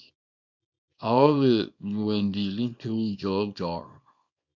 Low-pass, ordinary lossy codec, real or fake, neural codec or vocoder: 7.2 kHz; MP3, 64 kbps; fake; codec, 24 kHz, 0.9 kbps, WavTokenizer, small release